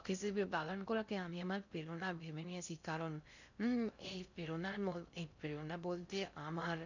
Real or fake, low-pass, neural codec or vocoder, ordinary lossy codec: fake; 7.2 kHz; codec, 16 kHz in and 24 kHz out, 0.6 kbps, FocalCodec, streaming, 2048 codes; none